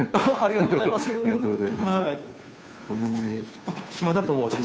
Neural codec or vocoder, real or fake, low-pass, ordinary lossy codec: codec, 16 kHz, 2 kbps, FunCodec, trained on Chinese and English, 25 frames a second; fake; none; none